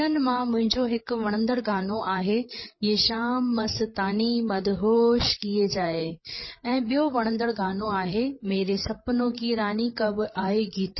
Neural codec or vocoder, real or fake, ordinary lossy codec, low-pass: codec, 16 kHz, 8 kbps, FreqCodec, larger model; fake; MP3, 24 kbps; 7.2 kHz